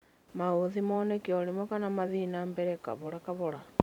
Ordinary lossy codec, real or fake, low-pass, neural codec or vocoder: none; fake; 19.8 kHz; vocoder, 44.1 kHz, 128 mel bands every 512 samples, BigVGAN v2